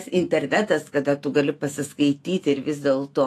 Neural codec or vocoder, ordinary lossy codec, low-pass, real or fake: autoencoder, 48 kHz, 128 numbers a frame, DAC-VAE, trained on Japanese speech; AAC, 48 kbps; 14.4 kHz; fake